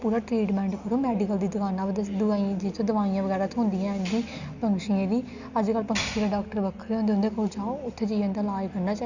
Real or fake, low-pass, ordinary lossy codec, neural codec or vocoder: real; 7.2 kHz; none; none